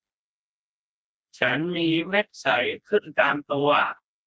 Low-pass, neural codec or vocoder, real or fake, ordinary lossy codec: none; codec, 16 kHz, 1 kbps, FreqCodec, smaller model; fake; none